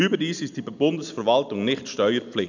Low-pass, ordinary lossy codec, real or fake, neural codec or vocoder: 7.2 kHz; none; real; none